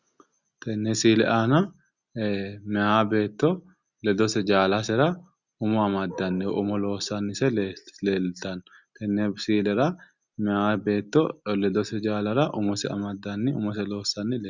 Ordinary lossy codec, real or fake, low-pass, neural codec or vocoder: Opus, 64 kbps; real; 7.2 kHz; none